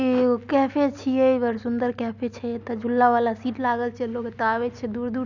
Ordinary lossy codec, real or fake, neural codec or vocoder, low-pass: none; real; none; 7.2 kHz